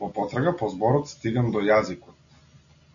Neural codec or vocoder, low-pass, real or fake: none; 7.2 kHz; real